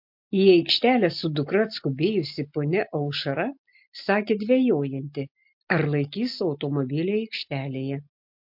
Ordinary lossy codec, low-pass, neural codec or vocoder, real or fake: MP3, 48 kbps; 5.4 kHz; none; real